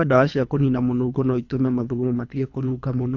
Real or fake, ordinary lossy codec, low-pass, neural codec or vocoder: fake; AAC, 48 kbps; 7.2 kHz; codec, 24 kHz, 3 kbps, HILCodec